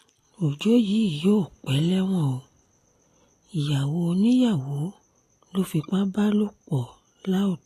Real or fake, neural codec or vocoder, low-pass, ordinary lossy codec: real; none; 14.4 kHz; AAC, 48 kbps